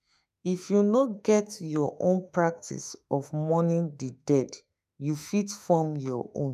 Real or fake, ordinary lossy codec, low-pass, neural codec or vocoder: fake; none; 14.4 kHz; autoencoder, 48 kHz, 32 numbers a frame, DAC-VAE, trained on Japanese speech